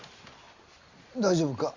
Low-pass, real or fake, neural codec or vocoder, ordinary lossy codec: 7.2 kHz; real; none; Opus, 64 kbps